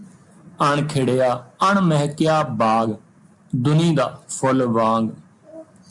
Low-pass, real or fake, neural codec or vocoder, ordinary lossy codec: 10.8 kHz; real; none; MP3, 96 kbps